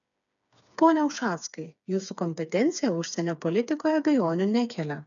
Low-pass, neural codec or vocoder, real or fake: 7.2 kHz; codec, 16 kHz, 4 kbps, FreqCodec, smaller model; fake